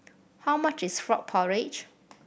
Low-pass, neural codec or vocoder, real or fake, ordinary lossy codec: none; none; real; none